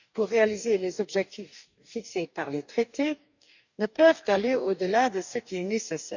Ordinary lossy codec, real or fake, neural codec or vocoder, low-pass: none; fake; codec, 44.1 kHz, 2.6 kbps, DAC; 7.2 kHz